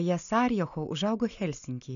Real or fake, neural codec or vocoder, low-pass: real; none; 7.2 kHz